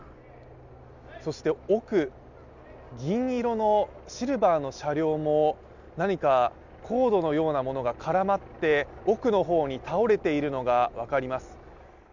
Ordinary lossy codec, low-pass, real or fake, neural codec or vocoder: none; 7.2 kHz; real; none